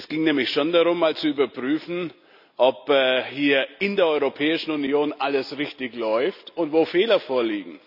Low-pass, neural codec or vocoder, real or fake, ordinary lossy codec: 5.4 kHz; none; real; none